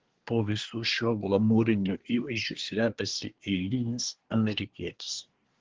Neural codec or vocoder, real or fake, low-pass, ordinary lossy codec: codec, 24 kHz, 1 kbps, SNAC; fake; 7.2 kHz; Opus, 16 kbps